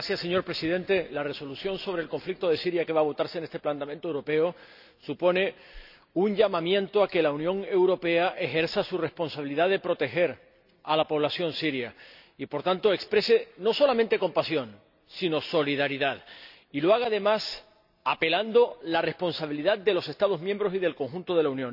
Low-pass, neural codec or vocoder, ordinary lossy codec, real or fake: 5.4 kHz; none; none; real